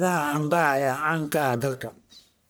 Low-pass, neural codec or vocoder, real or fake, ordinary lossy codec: none; codec, 44.1 kHz, 1.7 kbps, Pupu-Codec; fake; none